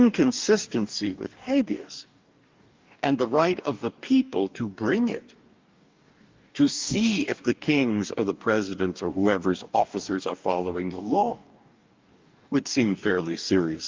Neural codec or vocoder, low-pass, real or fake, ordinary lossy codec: codec, 44.1 kHz, 2.6 kbps, DAC; 7.2 kHz; fake; Opus, 24 kbps